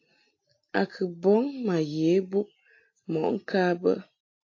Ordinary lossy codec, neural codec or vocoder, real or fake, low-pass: AAC, 48 kbps; none; real; 7.2 kHz